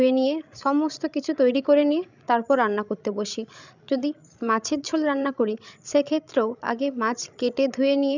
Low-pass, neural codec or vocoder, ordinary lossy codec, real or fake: 7.2 kHz; codec, 16 kHz, 16 kbps, FreqCodec, larger model; none; fake